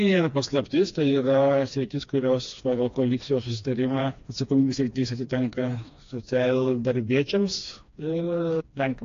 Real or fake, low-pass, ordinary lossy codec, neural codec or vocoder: fake; 7.2 kHz; AAC, 48 kbps; codec, 16 kHz, 2 kbps, FreqCodec, smaller model